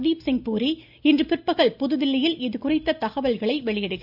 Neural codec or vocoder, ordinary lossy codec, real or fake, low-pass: none; none; real; 5.4 kHz